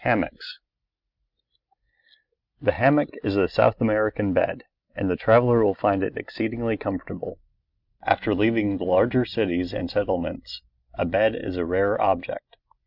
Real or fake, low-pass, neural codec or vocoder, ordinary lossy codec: real; 5.4 kHz; none; Opus, 64 kbps